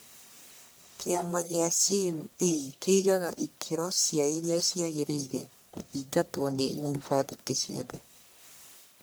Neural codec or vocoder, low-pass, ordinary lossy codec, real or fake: codec, 44.1 kHz, 1.7 kbps, Pupu-Codec; none; none; fake